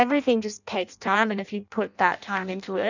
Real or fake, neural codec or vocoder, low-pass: fake; codec, 16 kHz in and 24 kHz out, 0.6 kbps, FireRedTTS-2 codec; 7.2 kHz